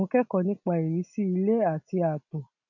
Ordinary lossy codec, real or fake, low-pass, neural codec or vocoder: none; real; 7.2 kHz; none